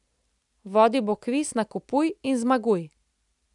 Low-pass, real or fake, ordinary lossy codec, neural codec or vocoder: 10.8 kHz; real; none; none